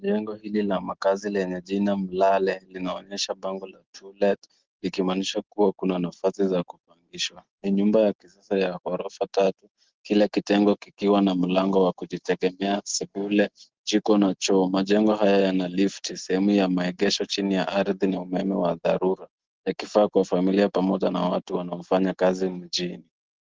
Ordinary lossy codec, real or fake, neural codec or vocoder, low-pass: Opus, 16 kbps; real; none; 7.2 kHz